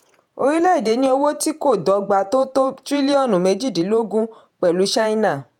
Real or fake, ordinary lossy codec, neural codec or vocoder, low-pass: fake; none; vocoder, 48 kHz, 128 mel bands, Vocos; 19.8 kHz